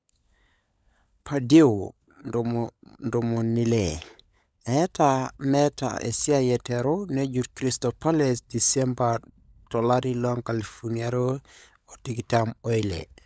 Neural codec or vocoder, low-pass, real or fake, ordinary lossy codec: codec, 16 kHz, 16 kbps, FunCodec, trained on LibriTTS, 50 frames a second; none; fake; none